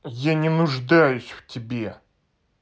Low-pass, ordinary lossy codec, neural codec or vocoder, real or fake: none; none; none; real